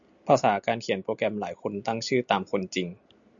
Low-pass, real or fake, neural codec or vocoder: 7.2 kHz; real; none